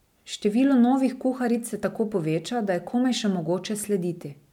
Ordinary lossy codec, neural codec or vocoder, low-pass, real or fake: MP3, 96 kbps; none; 19.8 kHz; real